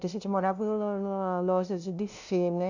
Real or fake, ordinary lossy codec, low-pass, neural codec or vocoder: fake; none; 7.2 kHz; codec, 16 kHz, 0.5 kbps, FunCodec, trained on LibriTTS, 25 frames a second